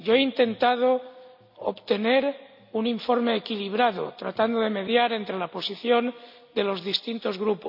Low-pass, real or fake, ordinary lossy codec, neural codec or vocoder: 5.4 kHz; real; none; none